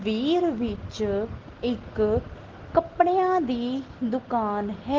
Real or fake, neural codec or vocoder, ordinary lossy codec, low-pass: real; none; Opus, 16 kbps; 7.2 kHz